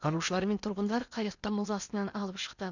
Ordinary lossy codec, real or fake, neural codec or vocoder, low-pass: none; fake; codec, 16 kHz in and 24 kHz out, 0.8 kbps, FocalCodec, streaming, 65536 codes; 7.2 kHz